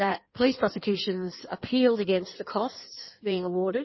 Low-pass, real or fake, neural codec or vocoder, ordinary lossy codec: 7.2 kHz; fake; codec, 16 kHz in and 24 kHz out, 1.1 kbps, FireRedTTS-2 codec; MP3, 24 kbps